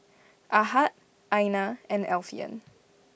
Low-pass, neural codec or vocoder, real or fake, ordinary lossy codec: none; none; real; none